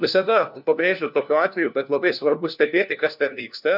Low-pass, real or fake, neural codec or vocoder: 5.4 kHz; fake; codec, 16 kHz, 1 kbps, FunCodec, trained on LibriTTS, 50 frames a second